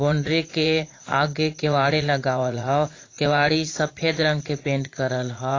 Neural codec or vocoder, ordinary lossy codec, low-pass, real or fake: vocoder, 44.1 kHz, 80 mel bands, Vocos; AAC, 32 kbps; 7.2 kHz; fake